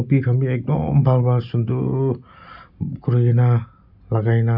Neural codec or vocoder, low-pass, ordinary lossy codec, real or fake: none; 5.4 kHz; none; real